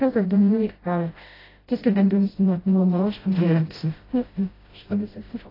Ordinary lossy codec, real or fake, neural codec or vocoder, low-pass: AAC, 24 kbps; fake; codec, 16 kHz, 0.5 kbps, FreqCodec, smaller model; 5.4 kHz